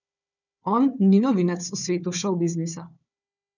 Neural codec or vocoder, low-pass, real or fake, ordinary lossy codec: codec, 16 kHz, 4 kbps, FunCodec, trained on Chinese and English, 50 frames a second; 7.2 kHz; fake; none